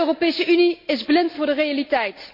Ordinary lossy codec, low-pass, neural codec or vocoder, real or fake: MP3, 32 kbps; 5.4 kHz; none; real